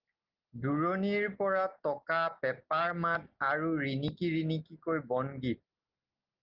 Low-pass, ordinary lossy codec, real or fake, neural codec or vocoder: 5.4 kHz; Opus, 32 kbps; real; none